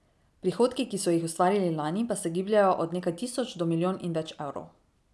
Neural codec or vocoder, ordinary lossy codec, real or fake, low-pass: none; none; real; none